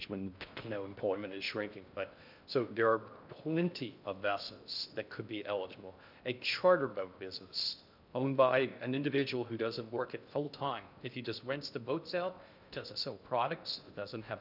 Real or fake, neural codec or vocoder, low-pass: fake; codec, 16 kHz in and 24 kHz out, 0.6 kbps, FocalCodec, streaming, 4096 codes; 5.4 kHz